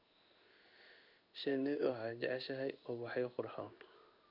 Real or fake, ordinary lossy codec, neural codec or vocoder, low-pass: fake; none; codec, 16 kHz in and 24 kHz out, 1 kbps, XY-Tokenizer; 5.4 kHz